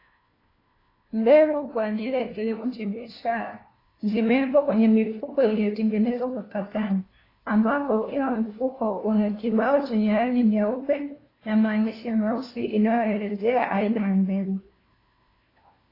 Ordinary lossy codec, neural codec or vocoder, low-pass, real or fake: AAC, 24 kbps; codec, 16 kHz, 1 kbps, FunCodec, trained on LibriTTS, 50 frames a second; 5.4 kHz; fake